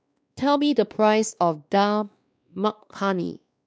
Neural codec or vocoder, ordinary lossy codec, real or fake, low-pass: codec, 16 kHz, 2 kbps, X-Codec, WavLM features, trained on Multilingual LibriSpeech; none; fake; none